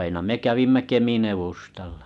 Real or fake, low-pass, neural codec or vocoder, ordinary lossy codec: real; none; none; none